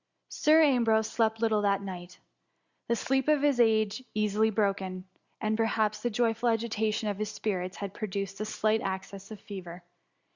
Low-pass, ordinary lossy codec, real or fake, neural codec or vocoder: 7.2 kHz; Opus, 64 kbps; real; none